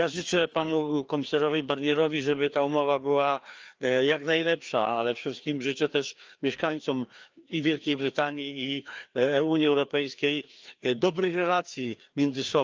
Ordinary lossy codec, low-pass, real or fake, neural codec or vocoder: Opus, 24 kbps; 7.2 kHz; fake; codec, 16 kHz, 2 kbps, FreqCodec, larger model